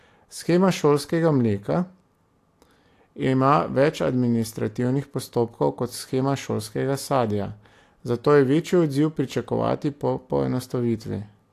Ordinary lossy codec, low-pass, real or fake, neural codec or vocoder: AAC, 64 kbps; 14.4 kHz; real; none